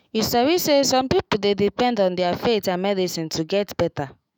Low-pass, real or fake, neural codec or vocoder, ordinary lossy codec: none; fake; autoencoder, 48 kHz, 128 numbers a frame, DAC-VAE, trained on Japanese speech; none